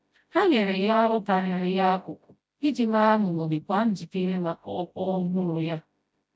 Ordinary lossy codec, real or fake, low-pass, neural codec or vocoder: none; fake; none; codec, 16 kHz, 0.5 kbps, FreqCodec, smaller model